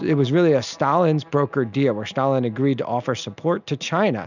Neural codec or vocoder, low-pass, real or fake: none; 7.2 kHz; real